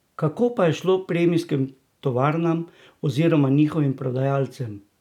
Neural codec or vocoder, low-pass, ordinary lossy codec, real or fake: none; 19.8 kHz; none; real